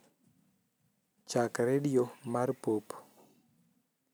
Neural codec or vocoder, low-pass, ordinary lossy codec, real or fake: vocoder, 44.1 kHz, 128 mel bands every 256 samples, BigVGAN v2; none; none; fake